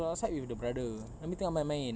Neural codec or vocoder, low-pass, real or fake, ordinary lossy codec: none; none; real; none